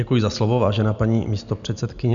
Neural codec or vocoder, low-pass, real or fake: none; 7.2 kHz; real